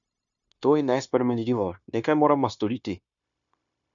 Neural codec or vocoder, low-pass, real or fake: codec, 16 kHz, 0.9 kbps, LongCat-Audio-Codec; 7.2 kHz; fake